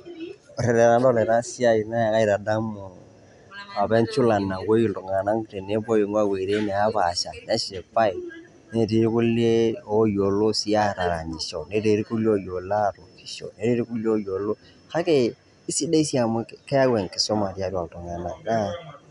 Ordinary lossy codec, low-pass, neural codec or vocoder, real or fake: none; 10.8 kHz; none; real